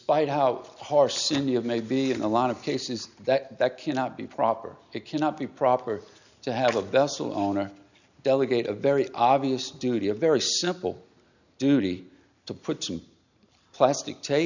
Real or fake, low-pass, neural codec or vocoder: real; 7.2 kHz; none